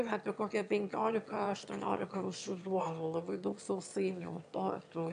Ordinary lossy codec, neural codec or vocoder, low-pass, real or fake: AAC, 64 kbps; autoencoder, 22.05 kHz, a latent of 192 numbers a frame, VITS, trained on one speaker; 9.9 kHz; fake